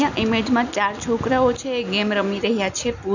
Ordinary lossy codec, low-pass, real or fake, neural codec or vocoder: none; 7.2 kHz; real; none